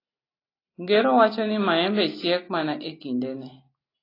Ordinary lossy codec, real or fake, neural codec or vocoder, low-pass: AAC, 24 kbps; real; none; 5.4 kHz